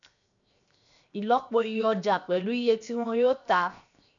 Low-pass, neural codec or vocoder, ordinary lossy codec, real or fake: 7.2 kHz; codec, 16 kHz, 0.7 kbps, FocalCodec; none; fake